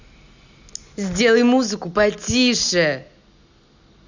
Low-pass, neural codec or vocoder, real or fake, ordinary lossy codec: 7.2 kHz; none; real; Opus, 64 kbps